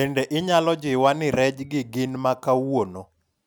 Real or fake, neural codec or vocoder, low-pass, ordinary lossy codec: real; none; none; none